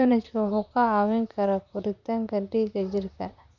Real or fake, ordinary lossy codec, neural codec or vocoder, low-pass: fake; none; vocoder, 44.1 kHz, 128 mel bands, Pupu-Vocoder; 7.2 kHz